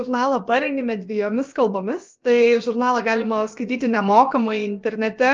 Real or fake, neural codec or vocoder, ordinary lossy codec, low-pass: fake; codec, 16 kHz, about 1 kbps, DyCAST, with the encoder's durations; Opus, 24 kbps; 7.2 kHz